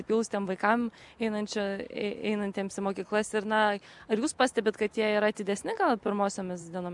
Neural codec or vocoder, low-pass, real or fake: none; 10.8 kHz; real